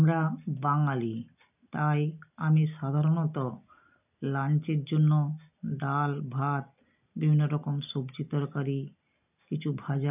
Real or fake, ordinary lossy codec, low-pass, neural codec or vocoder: real; none; 3.6 kHz; none